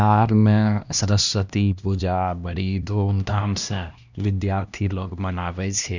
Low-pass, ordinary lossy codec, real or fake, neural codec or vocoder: 7.2 kHz; none; fake; codec, 16 kHz, 1 kbps, X-Codec, HuBERT features, trained on LibriSpeech